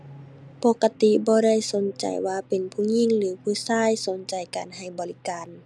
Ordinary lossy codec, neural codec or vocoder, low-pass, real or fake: none; none; none; real